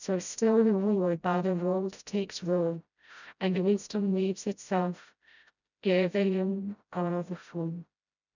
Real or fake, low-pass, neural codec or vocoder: fake; 7.2 kHz; codec, 16 kHz, 0.5 kbps, FreqCodec, smaller model